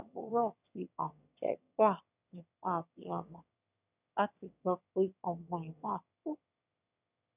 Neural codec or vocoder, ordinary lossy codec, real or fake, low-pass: autoencoder, 22.05 kHz, a latent of 192 numbers a frame, VITS, trained on one speaker; none; fake; 3.6 kHz